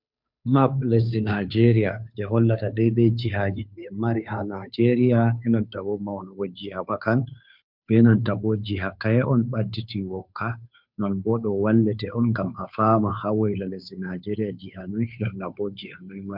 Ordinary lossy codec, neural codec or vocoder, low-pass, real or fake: MP3, 48 kbps; codec, 16 kHz, 2 kbps, FunCodec, trained on Chinese and English, 25 frames a second; 5.4 kHz; fake